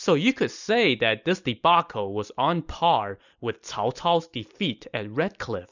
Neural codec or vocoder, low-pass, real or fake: none; 7.2 kHz; real